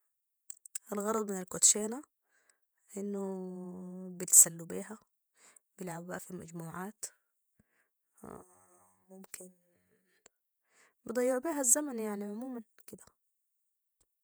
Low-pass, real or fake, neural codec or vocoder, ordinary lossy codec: none; fake; vocoder, 48 kHz, 128 mel bands, Vocos; none